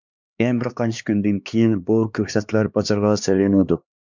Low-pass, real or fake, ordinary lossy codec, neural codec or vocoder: 7.2 kHz; fake; MP3, 64 kbps; codec, 16 kHz, 2 kbps, X-Codec, HuBERT features, trained on LibriSpeech